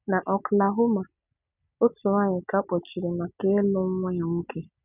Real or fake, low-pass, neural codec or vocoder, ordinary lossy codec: real; 3.6 kHz; none; none